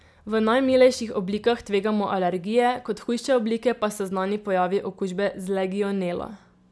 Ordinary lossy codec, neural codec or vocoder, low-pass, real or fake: none; none; none; real